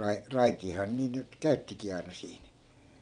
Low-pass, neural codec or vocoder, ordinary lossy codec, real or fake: 9.9 kHz; vocoder, 22.05 kHz, 80 mel bands, WaveNeXt; none; fake